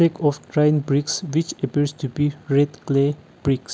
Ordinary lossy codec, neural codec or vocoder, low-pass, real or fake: none; none; none; real